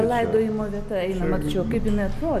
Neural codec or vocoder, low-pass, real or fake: none; 14.4 kHz; real